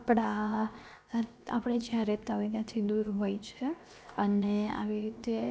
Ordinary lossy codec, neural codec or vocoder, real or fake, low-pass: none; codec, 16 kHz, 0.7 kbps, FocalCodec; fake; none